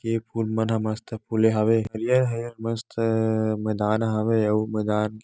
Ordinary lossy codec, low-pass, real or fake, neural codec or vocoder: none; none; real; none